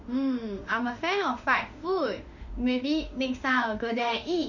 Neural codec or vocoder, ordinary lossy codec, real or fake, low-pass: autoencoder, 48 kHz, 32 numbers a frame, DAC-VAE, trained on Japanese speech; none; fake; 7.2 kHz